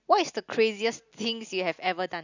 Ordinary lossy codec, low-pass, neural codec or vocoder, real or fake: none; 7.2 kHz; none; real